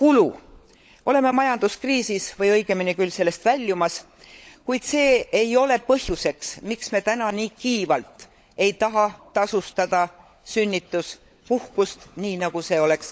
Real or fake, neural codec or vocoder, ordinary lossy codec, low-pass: fake; codec, 16 kHz, 16 kbps, FunCodec, trained on LibriTTS, 50 frames a second; none; none